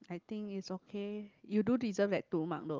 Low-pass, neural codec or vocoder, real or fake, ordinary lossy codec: 7.2 kHz; none; real; Opus, 32 kbps